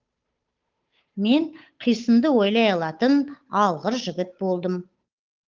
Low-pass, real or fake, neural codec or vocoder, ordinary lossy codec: 7.2 kHz; fake; codec, 16 kHz, 8 kbps, FunCodec, trained on Chinese and English, 25 frames a second; Opus, 24 kbps